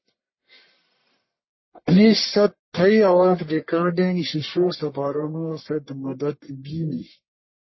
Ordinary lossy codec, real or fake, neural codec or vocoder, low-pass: MP3, 24 kbps; fake; codec, 44.1 kHz, 1.7 kbps, Pupu-Codec; 7.2 kHz